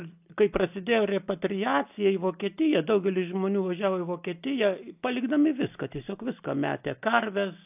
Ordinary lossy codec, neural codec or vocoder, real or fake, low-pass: AAC, 32 kbps; none; real; 3.6 kHz